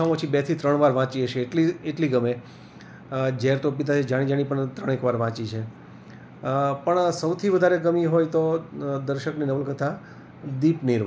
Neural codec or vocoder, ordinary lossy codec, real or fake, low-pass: none; none; real; none